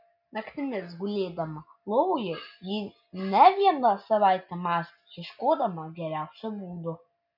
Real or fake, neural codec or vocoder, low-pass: real; none; 5.4 kHz